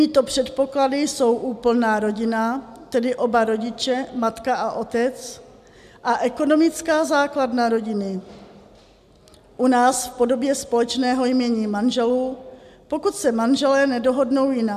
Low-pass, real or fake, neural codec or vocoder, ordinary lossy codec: 14.4 kHz; real; none; AAC, 96 kbps